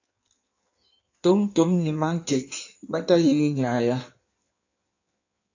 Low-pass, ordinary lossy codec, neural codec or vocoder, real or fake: 7.2 kHz; AAC, 48 kbps; codec, 16 kHz in and 24 kHz out, 1.1 kbps, FireRedTTS-2 codec; fake